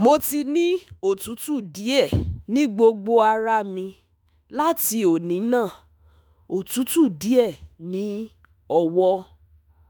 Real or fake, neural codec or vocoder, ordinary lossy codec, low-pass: fake; autoencoder, 48 kHz, 32 numbers a frame, DAC-VAE, trained on Japanese speech; none; none